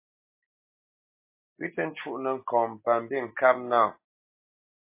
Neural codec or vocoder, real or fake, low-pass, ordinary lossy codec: none; real; 3.6 kHz; MP3, 24 kbps